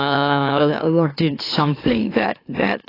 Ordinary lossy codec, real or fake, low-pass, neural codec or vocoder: AAC, 24 kbps; fake; 5.4 kHz; autoencoder, 44.1 kHz, a latent of 192 numbers a frame, MeloTTS